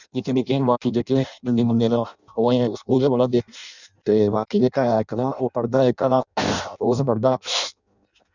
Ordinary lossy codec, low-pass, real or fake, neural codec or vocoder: none; 7.2 kHz; fake; codec, 16 kHz in and 24 kHz out, 0.6 kbps, FireRedTTS-2 codec